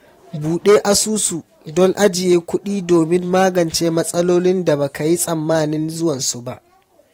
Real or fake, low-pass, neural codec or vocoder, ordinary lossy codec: fake; 19.8 kHz; vocoder, 44.1 kHz, 128 mel bands every 512 samples, BigVGAN v2; AAC, 48 kbps